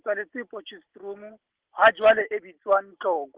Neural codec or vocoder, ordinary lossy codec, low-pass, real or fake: none; Opus, 16 kbps; 3.6 kHz; real